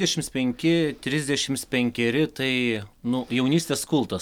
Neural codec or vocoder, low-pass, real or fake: none; 19.8 kHz; real